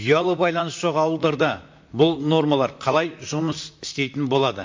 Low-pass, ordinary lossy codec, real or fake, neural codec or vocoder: 7.2 kHz; MP3, 48 kbps; fake; vocoder, 44.1 kHz, 128 mel bands, Pupu-Vocoder